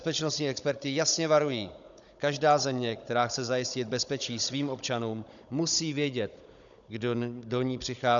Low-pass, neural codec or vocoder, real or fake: 7.2 kHz; codec, 16 kHz, 16 kbps, FunCodec, trained on Chinese and English, 50 frames a second; fake